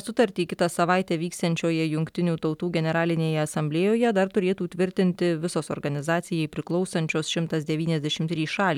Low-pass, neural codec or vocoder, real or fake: 19.8 kHz; none; real